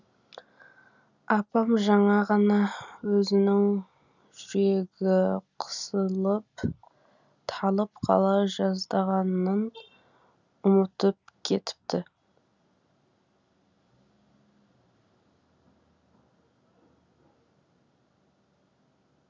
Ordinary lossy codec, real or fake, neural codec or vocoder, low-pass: none; real; none; 7.2 kHz